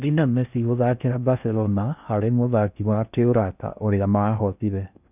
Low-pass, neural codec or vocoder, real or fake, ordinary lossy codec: 3.6 kHz; codec, 16 kHz in and 24 kHz out, 0.6 kbps, FocalCodec, streaming, 4096 codes; fake; none